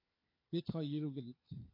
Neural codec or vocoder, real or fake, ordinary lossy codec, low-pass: codec, 16 kHz, 4 kbps, FunCodec, trained on LibriTTS, 50 frames a second; fake; MP3, 32 kbps; 5.4 kHz